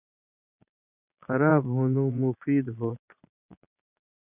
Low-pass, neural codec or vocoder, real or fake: 3.6 kHz; vocoder, 22.05 kHz, 80 mel bands, Vocos; fake